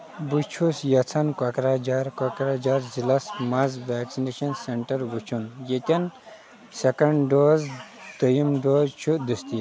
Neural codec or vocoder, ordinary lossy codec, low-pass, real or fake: none; none; none; real